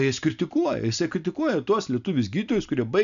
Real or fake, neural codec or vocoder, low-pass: real; none; 7.2 kHz